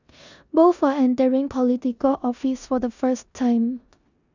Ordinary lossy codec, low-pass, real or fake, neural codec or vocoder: none; 7.2 kHz; fake; codec, 16 kHz in and 24 kHz out, 0.9 kbps, LongCat-Audio-Codec, four codebook decoder